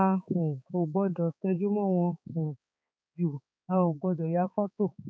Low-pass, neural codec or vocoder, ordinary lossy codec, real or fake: none; codec, 16 kHz, 4 kbps, X-Codec, HuBERT features, trained on balanced general audio; none; fake